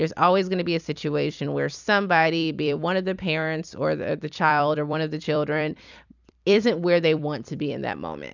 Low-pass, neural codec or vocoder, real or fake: 7.2 kHz; vocoder, 44.1 kHz, 128 mel bands every 256 samples, BigVGAN v2; fake